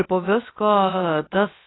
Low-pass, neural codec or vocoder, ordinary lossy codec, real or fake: 7.2 kHz; codec, 16 kHz, about 1 kbps, DyCAST, with the encoder's durations; AAC, 16 kbps; fake